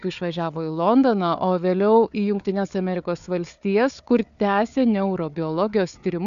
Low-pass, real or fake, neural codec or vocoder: 7.2 kHz; fake; codec, 16 kHz, 4 kbps, FunCodec, trained on Chinese and English, 50 frames a second